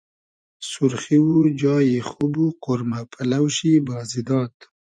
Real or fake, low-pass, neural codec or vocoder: fake; 9.9 kHz; vocoder, 24 kHz, 100 mel bands, Vocos